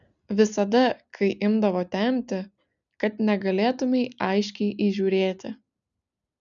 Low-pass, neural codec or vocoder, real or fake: 7.2 kHz; none; real